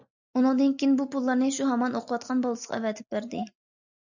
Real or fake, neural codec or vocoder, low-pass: real; none; 7.2 kHz